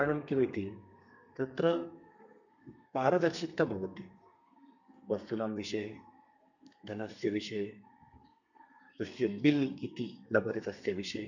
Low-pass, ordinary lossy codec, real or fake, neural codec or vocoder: 7.2 kHz; none; fake; codec, 32 kHz, 1.9 kbps, SNAC